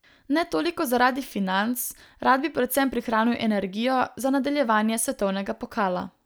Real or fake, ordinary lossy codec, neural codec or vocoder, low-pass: real; none; none; none